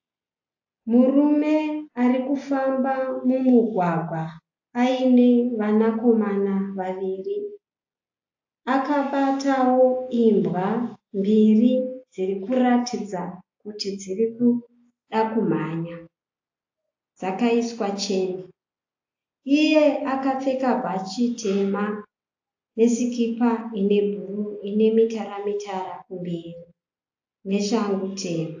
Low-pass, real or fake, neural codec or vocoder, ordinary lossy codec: 7.2 kHz; real; none; AAC, 48 kbps